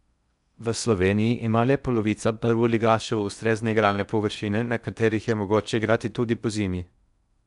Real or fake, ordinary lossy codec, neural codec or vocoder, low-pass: fake; none; codec, 16 kHz in and 24 kHz out, 0.6 kbps, FocalCodec, streaming, 4096 codes; 10.8 kHz